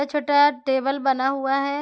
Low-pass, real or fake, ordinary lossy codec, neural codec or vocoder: none; real; none; none